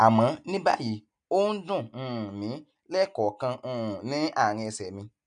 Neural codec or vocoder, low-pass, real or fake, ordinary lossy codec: none; 9.9 kHz; real; none